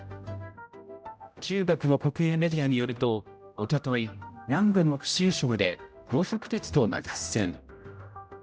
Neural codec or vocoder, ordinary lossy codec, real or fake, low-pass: codec, 16 kHz, 0.5 kbps, X-Codec, HuBERT features, trained on general audio; none; fake; none